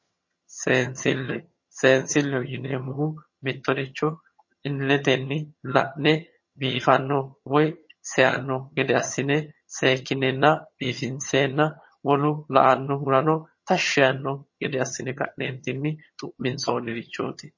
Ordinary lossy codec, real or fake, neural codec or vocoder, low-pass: MP3, 32 kbps; fake; vocoder, 22.05 kHz, 80 mel bands, HiFi-GAN; 7.2 kHz